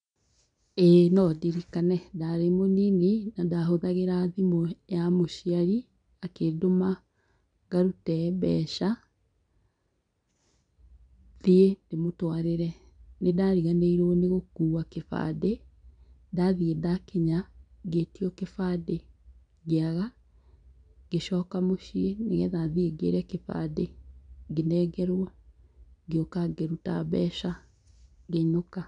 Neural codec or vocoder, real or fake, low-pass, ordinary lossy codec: none; real; 9.9 kHz; none